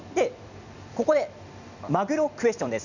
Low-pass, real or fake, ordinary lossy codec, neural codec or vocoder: 7.2 kHz; fake; none; vocoder, 44.1 kHz, 128 mel bands every 256 samples, BigVGAN v2